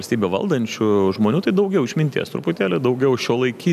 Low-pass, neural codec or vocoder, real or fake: 14.4 kHz; none; real